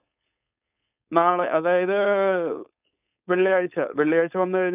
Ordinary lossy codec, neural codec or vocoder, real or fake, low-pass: none; codec, 16 kHz, 4.8 kbps, FACodec; fake; 3.6 kHz